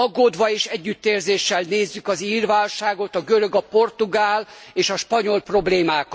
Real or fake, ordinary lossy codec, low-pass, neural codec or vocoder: real; none; none; none